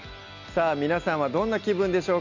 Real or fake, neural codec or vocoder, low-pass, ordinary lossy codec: real; none; 7.2 kHz; none